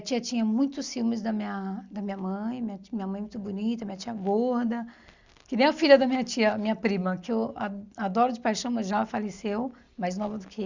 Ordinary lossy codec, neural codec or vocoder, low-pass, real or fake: Opus, 64 kbps; none; 7.2 kHz; real